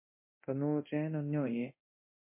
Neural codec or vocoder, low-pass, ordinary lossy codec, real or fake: codec, 24 kHz, 0.9 kbps, DualCodec; 3.6 kHz; MP3, 24 kbps; fake